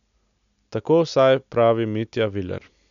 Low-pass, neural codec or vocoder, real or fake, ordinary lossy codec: 7.2 kHz; none; real; none